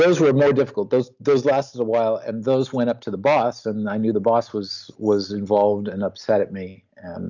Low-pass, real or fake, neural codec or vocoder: 7.2 kHz; real; none